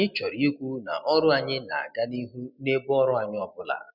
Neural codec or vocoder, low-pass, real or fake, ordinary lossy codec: none; 5.4 kHz; real; none